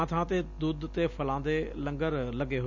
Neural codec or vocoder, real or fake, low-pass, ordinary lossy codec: none; real; 7.2 kHz; none